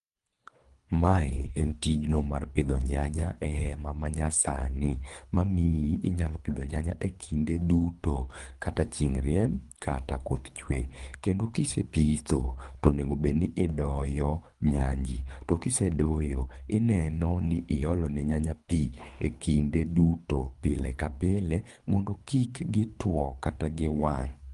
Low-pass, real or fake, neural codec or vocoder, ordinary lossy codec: 10.8 kHz; fake; codec, 24 kHz, 3 kbps, HILCodec; Opus, 32 kbps